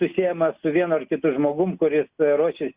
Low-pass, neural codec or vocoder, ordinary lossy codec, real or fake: 3.6 kHz; none; Opus, 64 kbps; real